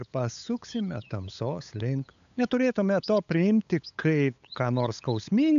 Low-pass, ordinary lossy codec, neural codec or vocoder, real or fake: 7.2 kHz; AAC, 96 kbps; codec, 16 kHz, 8 kbps, FunCodec, trained on LibriTTS, 25 frames a second; fake